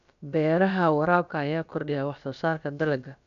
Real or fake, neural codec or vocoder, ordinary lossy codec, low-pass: fake; codec, 16 kHz, about 1 kbps, DyCAST, with the encoder's durations; none; 7.2 kHz